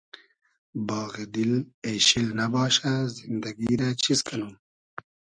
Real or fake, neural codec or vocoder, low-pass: real; none; 9.9 kHz